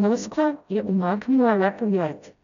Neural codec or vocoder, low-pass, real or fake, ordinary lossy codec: codec, 16 kHz, 0.5 kbps, FreqCodec, smaller model; 7.2 kHz; fake; none